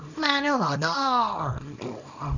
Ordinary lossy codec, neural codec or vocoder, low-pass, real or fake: none; codec, 24 kHz, 0.9 kbps, WavTokenizer, small release; 7.2 kHz; fake